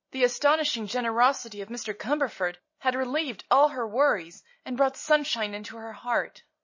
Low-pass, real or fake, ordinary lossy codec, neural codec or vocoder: 7.2 kHz; real; MP3, 32 kbps; none